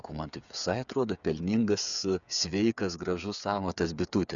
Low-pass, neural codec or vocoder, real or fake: 7.2 kHz; codec, 16 kHz, 8 kbps, FreqCodec, smaller model; fake